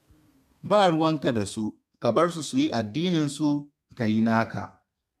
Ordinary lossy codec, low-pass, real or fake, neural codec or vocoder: none; 14.4 kHz; fake; codec, 32 kHz, 1.9 kbps, SNAC